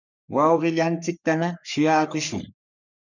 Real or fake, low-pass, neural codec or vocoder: fake; 7.2 kHz; codec, 44.1 kHz, 3.4 kbps, Pupu-Codec